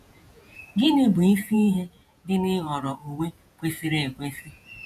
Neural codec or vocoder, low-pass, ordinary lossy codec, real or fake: vocoder, 48 kHz, 128 mel bands, Vocos; 14.4 kHz; none; fake